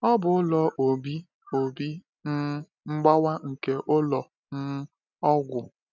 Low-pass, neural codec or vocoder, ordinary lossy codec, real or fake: 7.2 kHz; none; none; real